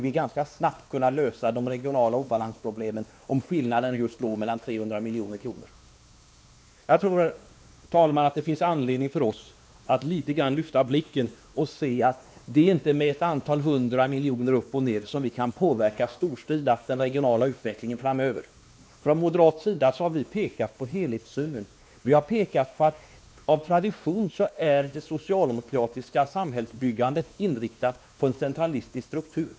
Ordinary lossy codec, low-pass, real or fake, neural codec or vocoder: none; none; fake; codec, 16 kHz, 2 kbps, X-Codec, WavLM features, trained on Multilingual LibriSpeech